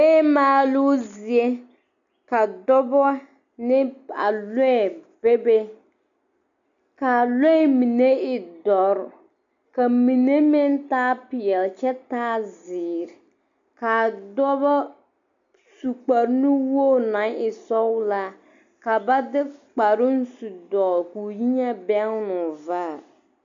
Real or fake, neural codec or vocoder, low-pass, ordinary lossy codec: real; none; 7.2 kHz; MP3, 64 kbps